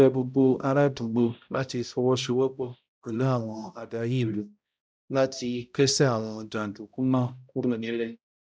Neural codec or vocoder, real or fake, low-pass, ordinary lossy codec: codec, 16 kHz, 0.5 kbps, X-Codec, HuBERT features, trained on balanced general audio; fake; none; none